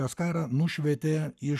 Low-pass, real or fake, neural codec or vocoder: 14.4 kHz; fake; codec, 44.1 kHz, 7.8 kbps, Pupu-Codec